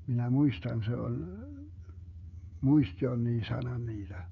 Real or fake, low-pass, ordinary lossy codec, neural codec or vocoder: fake; 7.2 kHz; none; codec, 16 kHz, 16 kbps, FreqCodec, smaller model